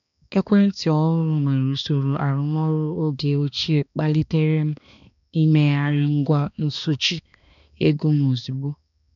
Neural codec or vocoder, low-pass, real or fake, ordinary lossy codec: codec, 16 kHz, 2 kbps, X-Codec, HuBERT features, trained on balanced general audio; 7.2 kHz; fake; none